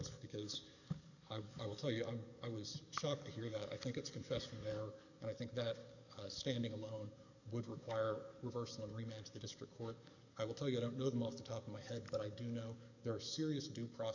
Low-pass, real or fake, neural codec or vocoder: 7.2 kHz; fake; codec, 44.1 kHz, 7.8 kbps, DAC